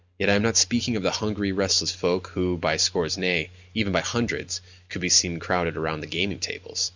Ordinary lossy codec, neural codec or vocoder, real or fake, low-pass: Opus, 64 kbps; none; real; 7.2 kHz